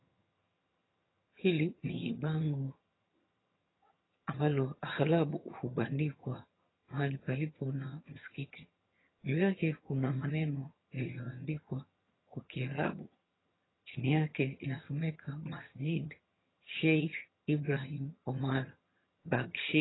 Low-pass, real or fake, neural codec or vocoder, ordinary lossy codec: 7.2 kHz; fake; vocoder, 22.05 kHz, 80 mel bands, HiFi-GAN; AAC, 16 kbps